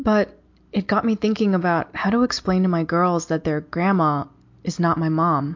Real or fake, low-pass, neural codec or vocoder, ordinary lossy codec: real; 7.2 kHz; none; MP3, 48 kbps